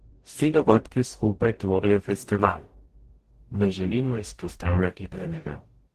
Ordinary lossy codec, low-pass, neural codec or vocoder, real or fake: Opus, 16 kbps; 14.4 kHz; codec, 44.1 kHz, 0.9 kbps, DAC; fake